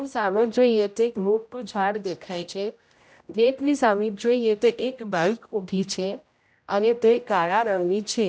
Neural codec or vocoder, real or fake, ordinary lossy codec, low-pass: codec, 16 kHz, 0.5 kbps, X-Codec, HuBERT features, trained on general audio; fake; none; none